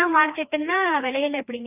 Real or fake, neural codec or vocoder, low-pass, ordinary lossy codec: fake; codec, 16 kHz, 2 kbps, FreqCodec, larger model; 3.6 kHz; none